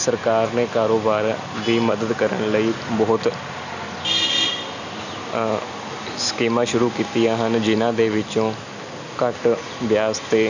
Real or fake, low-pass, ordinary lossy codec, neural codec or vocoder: real; 7.2 kHz; none; none